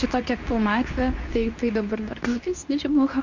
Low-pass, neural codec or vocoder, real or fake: 7.2 kHz; codec, 24 kHz, 0.9 kbps, WavTokenizer, medium speech release version 2; fake